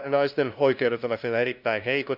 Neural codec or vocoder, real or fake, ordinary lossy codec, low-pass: codec, 16 kHz, 0.5 kbps, FunCodec, trained on LibriTTS, 25 frames a second; fake; none; 5.4 kHz